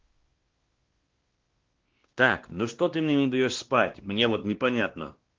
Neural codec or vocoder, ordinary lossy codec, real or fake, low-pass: codec, 16 kHz, 1 kbps, X-Codec, WavLM features, trained on Multilingual LibriSpeech; Opus, 16 kbps; fake; 7.2 kHz